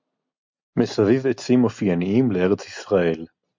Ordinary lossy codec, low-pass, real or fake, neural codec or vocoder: MP3, 64 kbps; 7.2 kHz; real; none